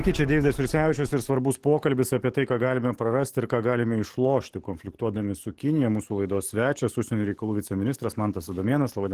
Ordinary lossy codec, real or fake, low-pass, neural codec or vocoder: Opus, 16 kbps; fake; 14.4 kHz; codec, 44.1 kHz, 7.8 kbps, DAC